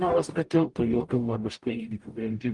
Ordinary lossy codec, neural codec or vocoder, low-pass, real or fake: Opus, 32 kbps; codec, 44.1 kHz, 0.9 kbps, DAC; 10.8 kHz; fake